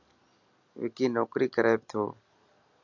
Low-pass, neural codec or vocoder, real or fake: 7.2 kHz; none; real